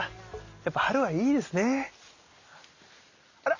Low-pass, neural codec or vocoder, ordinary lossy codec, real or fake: 7.2 kHz; none; Opus, 64 kbps; real